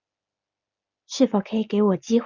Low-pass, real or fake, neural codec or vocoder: 7.2 kHz; real; none